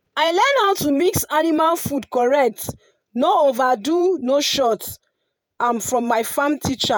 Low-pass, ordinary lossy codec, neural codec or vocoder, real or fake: none; none; vocoder, 48 kHz, 128 mel bands, Vocos; fake